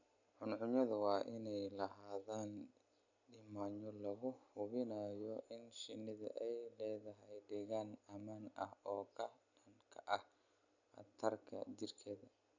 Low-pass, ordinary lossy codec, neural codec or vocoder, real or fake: 7.2 kHz; none; none; real